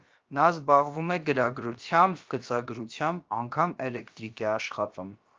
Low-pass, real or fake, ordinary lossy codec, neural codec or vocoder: 7.2 kHz; fake; Opus, 24 kbps; codec, 16 kHz, about 1 kbps, DyCAST, with the encoder's durations